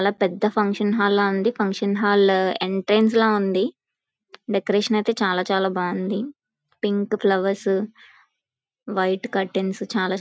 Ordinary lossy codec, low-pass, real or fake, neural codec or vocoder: none; none; real; none